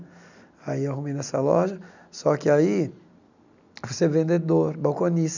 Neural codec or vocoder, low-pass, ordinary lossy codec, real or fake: none; 7.2 kHz; none; real